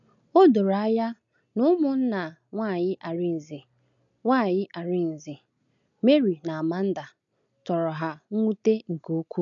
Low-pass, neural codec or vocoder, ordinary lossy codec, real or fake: 7.2 kHz; none; none; real